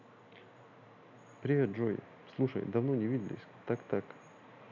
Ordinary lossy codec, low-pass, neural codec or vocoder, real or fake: none; 7.2 kHz; none; real